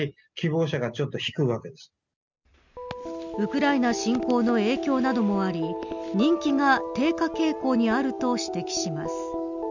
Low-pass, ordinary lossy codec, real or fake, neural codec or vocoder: 7.2 kHz; none; real; none